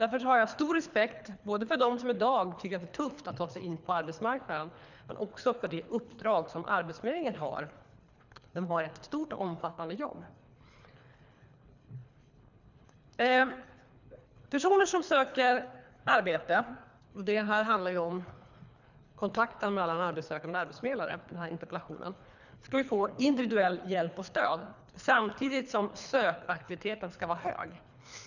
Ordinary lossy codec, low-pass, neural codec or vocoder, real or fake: none; 7.2 kHz; codec, 24 kHz, 3 kbps, HILCodec; fake